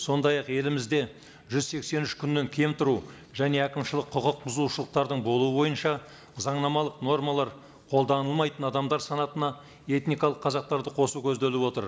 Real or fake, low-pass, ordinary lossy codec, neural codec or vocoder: real; none; none; none